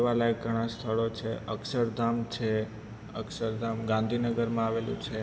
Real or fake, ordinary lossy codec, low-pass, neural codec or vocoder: real; none; none; none